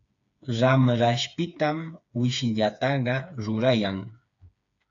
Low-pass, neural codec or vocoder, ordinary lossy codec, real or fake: 7.2 kHz; codec, 16 kHz, 4 kbps, FreqCodec, smaller model; AAC, 64 kbps; fake